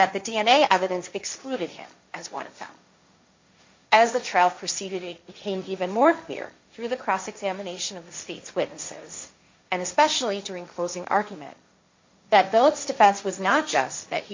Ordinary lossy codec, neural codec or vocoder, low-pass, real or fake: MP3, 48 kbps; codec, 16 kHz, 1.1 kbps, Voila-Tokenizer; 7.2 kHz; fake